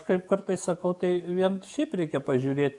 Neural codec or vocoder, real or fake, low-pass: codec, 44.1 kHz, 7.8 kbps, DAC; fake; 10.8 kHz